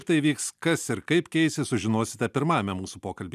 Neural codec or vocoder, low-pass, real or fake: none; 14.4 kHz; real